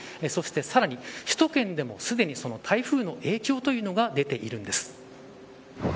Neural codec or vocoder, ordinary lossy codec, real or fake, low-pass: none; none; real; none